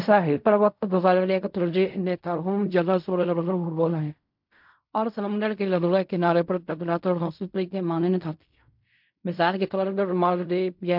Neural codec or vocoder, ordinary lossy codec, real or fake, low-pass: codec, 16 kHz in and 24 kHz out, 0.4 kbps, LongCat-Audio-Codec, fine tuned four codebook decoder; MP3, 48 kbps; fake; 5.4 kHz